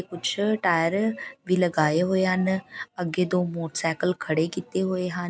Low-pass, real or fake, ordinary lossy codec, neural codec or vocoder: none; real; none; none